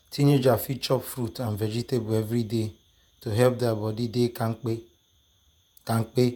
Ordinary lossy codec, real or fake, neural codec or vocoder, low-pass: none; fake; vocoder, 48 kHz, 128 mel bands, Vocos; none